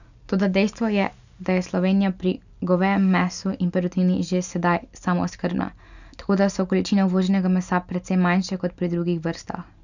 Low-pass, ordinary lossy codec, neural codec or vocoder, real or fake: 7.2 kHz; none; none; real